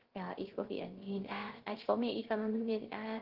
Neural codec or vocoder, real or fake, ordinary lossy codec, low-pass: codec, 16 kHz, 0.3 kbps, FocalCodec; fake; Opus, 16 kbps; 5.4 kHz